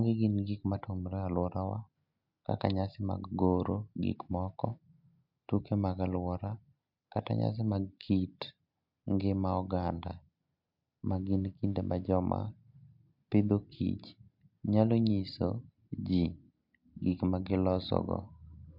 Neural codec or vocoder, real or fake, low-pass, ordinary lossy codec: none; real; 5.4 kHz; MP3, 48 kbps